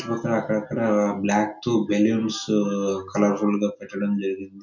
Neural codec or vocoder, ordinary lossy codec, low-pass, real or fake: none; none; 7.2 kHz; real